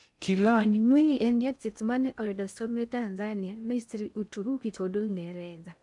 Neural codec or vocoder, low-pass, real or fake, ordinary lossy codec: codec, 16 kHz in and 24 kHz out, 0.6 kbps, FocalCodec, streaming, 2048 codes; 10.8 kHz; fake; none